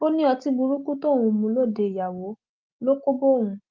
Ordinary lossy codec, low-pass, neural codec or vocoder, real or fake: Opus, 24 kbps; 7.2 kHz; none; real